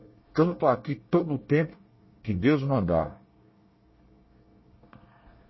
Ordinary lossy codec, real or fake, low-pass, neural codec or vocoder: MP3, 24 kbps; fake; 7.2 kHz; codec, 24 kHz, 1 kbps, SNAC